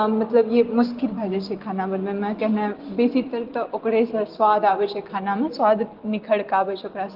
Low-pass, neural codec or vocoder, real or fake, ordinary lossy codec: 5.4 kHz; none; real; Opus, 16 kbps